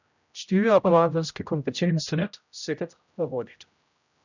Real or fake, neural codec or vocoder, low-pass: fake; codec, 16 kHz, 0.5 kbps, X-Codec, HuBERT features, trained on general audio; 7.2 kHz